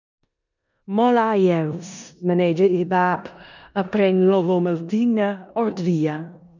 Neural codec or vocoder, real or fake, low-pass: codec, 16 kHz in and 24 kHz out, 0.9 kbps, LongCat-Audio-Codec, four codebook decoder; fake; 7.2 kHz